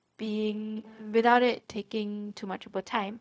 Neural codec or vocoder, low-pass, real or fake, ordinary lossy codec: codec, 16 kHz, 0.4 kbps, LongCat-Audio-Codec; none; fake; none